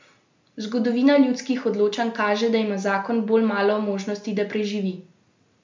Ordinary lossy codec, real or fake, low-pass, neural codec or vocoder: MP3, 64 kbps; real; 7.2 kHz; none